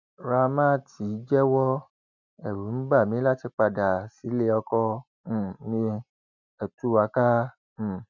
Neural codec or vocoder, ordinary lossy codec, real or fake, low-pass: none; none; real; 7.2 kHz